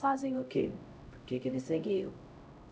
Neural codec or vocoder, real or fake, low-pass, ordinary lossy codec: codec, 16 kHz, 0.5 kbps, X-Codec, HuBERT features, trained on LibriSpeech; fake; none; none